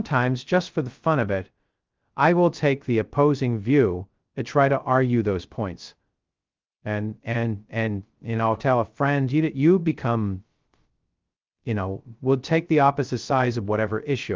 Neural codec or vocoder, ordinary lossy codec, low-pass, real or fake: codec, 16 kHz, 0.2 kbps, FocalCodec; Opus, 32 kbps; 7.2 kHz; fake